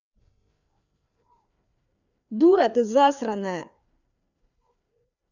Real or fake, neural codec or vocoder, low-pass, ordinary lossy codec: fake; codec, 16 kHz, 2 kbps, FreqCodec, larger model; 7.2 kHz; none